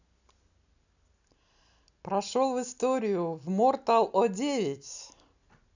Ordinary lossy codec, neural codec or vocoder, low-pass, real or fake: none; none; 7.2 kHz; real